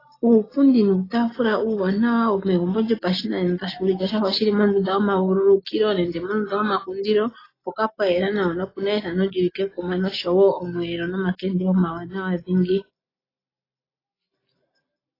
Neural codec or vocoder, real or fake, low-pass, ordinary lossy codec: none; real; 5.4 kHz; AAC, 24 kbps